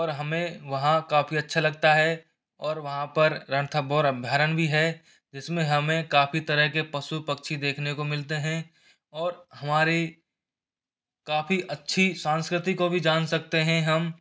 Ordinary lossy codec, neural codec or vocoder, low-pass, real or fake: none; none; none; real